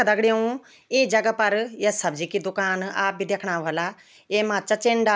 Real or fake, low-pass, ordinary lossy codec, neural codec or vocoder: real; none; none; none